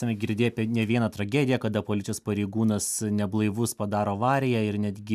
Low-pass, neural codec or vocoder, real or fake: 14.4 kHz; none; real